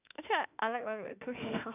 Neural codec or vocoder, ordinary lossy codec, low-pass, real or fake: autoencoder, 48 kHz, 32 numbers a frame, DAC-VAE, trained on Japanese speech; none; 3.6 kHz; fake